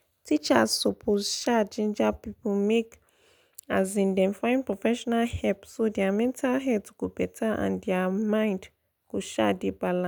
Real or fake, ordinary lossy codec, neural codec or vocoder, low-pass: real; none; none; none